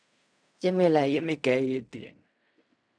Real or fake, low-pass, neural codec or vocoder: fake; 9.9 kHz; codec, 16 kHz in and 24 kHz out, 0.4 kbps, LongCat-Audio-Codec, fine tuned four codebook decoder